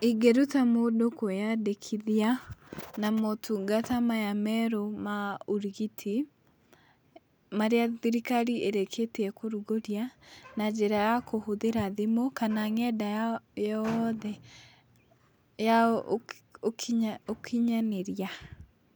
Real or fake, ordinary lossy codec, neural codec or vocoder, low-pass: real; none; none; none